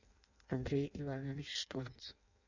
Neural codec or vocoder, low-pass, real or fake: codec, 16 kHz in and 24 kHz out, 0.6 kbps, FireRedTTS-2 codec; 7.2 kHz; fake